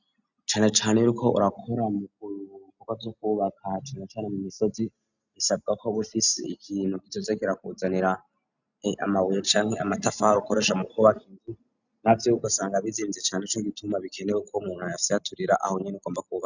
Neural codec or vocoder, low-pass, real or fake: none; 7.2 kHz; real